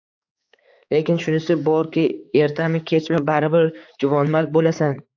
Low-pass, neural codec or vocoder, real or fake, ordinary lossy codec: 7.2 kHz; codec, 16 kHz, 4 kbps, X-Codec, HuBERT features, trained on balanced general audio; fake; AAC, 48 kbps